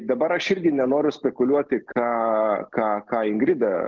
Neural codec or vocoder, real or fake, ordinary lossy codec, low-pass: none; real; Opus, 16 kbps; 7.2 kHz